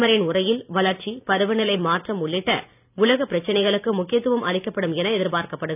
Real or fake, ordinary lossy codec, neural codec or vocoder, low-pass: real; none; none; 3.6 kHz